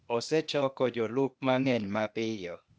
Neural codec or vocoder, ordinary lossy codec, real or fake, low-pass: codec, 16 kHz, 0.8 kbps, ZipCodec; none; fake; none